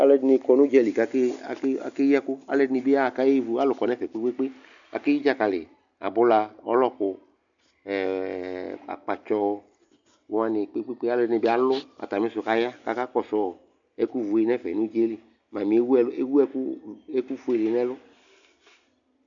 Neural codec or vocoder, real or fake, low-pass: none; real; 7.2 kHz